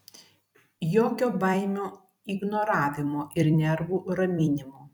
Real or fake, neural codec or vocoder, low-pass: fake; vocoder, 44.1 kHz, 128 mel bands every 256 samples, BigVGAN v2; 19.8 kHz